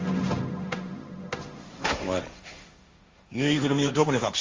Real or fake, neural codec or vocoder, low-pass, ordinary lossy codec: fake; codec, 16 kHz, 1.1 kbps, Voila-Tokenizer; 7.2 kHz; Opus, 32 kbps